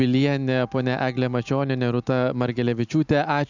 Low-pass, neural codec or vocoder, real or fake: 7.2 kHz; none; real